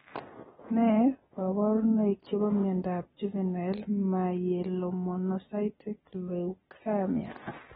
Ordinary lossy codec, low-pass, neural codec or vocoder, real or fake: AAC, 16 kbps; 10.8 kHz; none; real